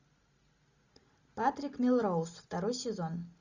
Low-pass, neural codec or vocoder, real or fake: 7.2 kHz; none; real